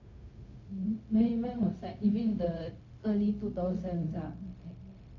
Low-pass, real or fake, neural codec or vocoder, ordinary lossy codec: 7.2 kHz; fake; codec, 16 kHz, 0.4 kbps, LongCat-Audio-Codec; MP3, 48 kbps